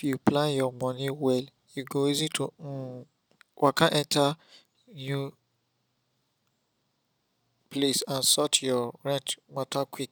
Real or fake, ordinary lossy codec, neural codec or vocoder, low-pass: real; none; none; none